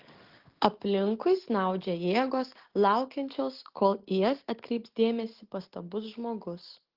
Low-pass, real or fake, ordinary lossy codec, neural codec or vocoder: 5.4 kHz; real; Opus, 16 kbps; none